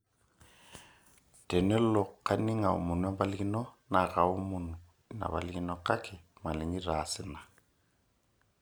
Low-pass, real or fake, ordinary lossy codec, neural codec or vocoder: none; real; none; none